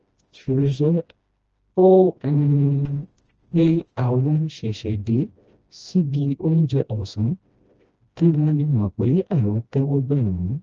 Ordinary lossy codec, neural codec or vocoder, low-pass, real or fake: Opus, 24 kbps; codec, 16 kHz, 1 kbps, FreqCodec, smaller model; 7.2 kHz; fake